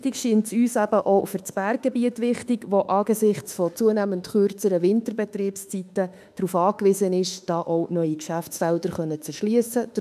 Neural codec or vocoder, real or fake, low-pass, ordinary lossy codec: autoencoder, 48 kHz, 32 numbers a frame, DAC-VAE, trained on Japanese speech; fake; 14.4 kHz; none